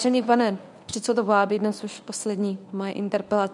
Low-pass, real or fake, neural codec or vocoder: 10.8 kHz; fake; codec, 24 kHz, 0.9 kbps, WavTokenizer, medium speech release version 1